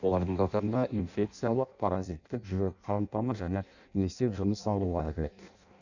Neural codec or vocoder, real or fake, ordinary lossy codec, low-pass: codec, 16 kHz in and 24 kHz out, 0.6 kbps, FireRedTTS-2 codec; fake; none; 7.2 kHz